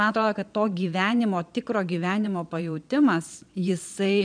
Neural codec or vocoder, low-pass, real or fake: vocoder, 22.05 kHz, 80 mel bands, Vocos; 9.9 kHz; fake